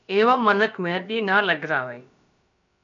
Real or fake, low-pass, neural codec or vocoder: fake; 7.2 kHz; codec, 16 kHz, about 1 kbps, DyCAST, with the encoder's durations